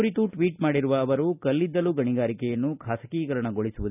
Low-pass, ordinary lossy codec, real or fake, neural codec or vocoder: 3.6 kHz; none; real; none